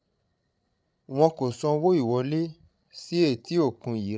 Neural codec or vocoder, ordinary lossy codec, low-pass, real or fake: codec, 16 kHz, 16 kbps, FreqCodec, larger model; none; none; fake